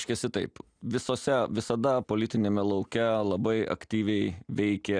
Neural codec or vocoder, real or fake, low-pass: none; real; 9.9 kHz